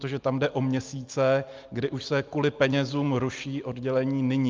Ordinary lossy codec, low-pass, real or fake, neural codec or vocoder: Opus, 24 kbps; 7.2 kHz; real; none